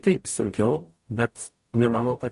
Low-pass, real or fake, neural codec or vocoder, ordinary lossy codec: 14.4 kHz; fake; codec, 44.1 kHz, 0.9 kbps, DAC; MP3, 48 kbps